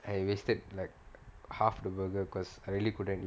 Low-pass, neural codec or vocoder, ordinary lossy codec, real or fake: none; none; none; real